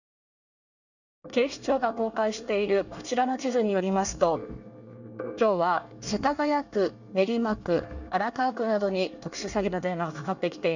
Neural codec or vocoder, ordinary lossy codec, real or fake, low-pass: codec, 24 kHz, 1 kbps, SNAC; none; fake; 7.2 kHz